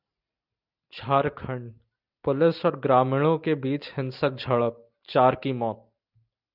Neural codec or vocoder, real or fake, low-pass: none; real; 5.4 kHz